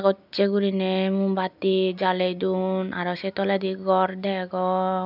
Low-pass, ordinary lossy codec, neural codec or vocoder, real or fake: 5.4 kHz; none; none; real